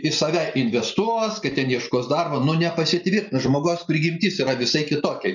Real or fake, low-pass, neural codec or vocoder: real; 7.2 kHz; none